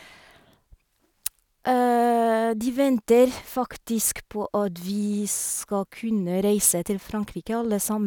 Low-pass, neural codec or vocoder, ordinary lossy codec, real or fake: none; none; none; real